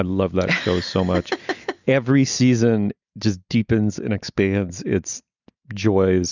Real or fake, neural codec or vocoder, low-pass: real; none; 7.2 kHz